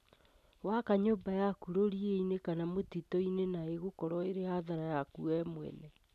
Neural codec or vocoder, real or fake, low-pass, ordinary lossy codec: none; real; 14.4 kHz; none